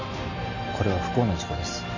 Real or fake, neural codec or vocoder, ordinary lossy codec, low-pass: real; none; none; 7.2 kHz